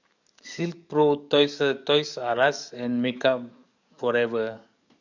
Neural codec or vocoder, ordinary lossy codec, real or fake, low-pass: codec, 44.1 kHz, 7.8 kbps, DAC; none; fake; 7.2 kHz